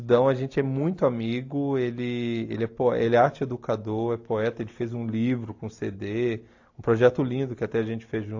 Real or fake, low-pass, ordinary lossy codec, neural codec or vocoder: real; 7.2 kHz; AAC, 48 kbps; none